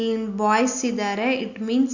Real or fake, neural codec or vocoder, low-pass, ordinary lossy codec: real; none; 7.2 kHz; Opus, 64 kbps